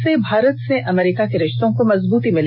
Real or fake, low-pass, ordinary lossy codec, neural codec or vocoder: real; 5.4 kHz; none; none